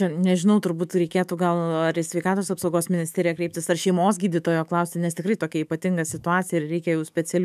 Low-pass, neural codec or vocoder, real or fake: 14.4 kHz; autoencoder, 48 kHz, 128 numbers a frame, DAC-VAE, trained on Japanese speech; fake